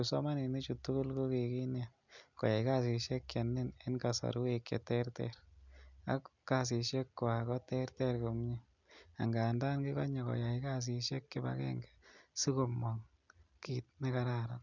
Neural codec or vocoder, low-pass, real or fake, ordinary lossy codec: none; 7.2 kHz; real; none